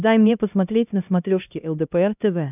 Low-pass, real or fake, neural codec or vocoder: 3.6 kHz; fake; codec, 16 kHz, 1 kbps, X-Codec, HuBERT features, trained on LibriSpeech